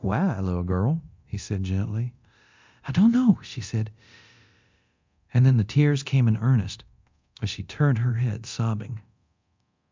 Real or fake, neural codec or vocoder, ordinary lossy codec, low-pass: fake; codec, 24 kHz, 0.9 kbps, DualCodec; MP3, 64 kbps; 7.2 kHz